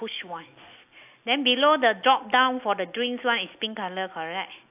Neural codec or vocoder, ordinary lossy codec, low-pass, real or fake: none; AAC, 32 kbps; 3.6 kHz; real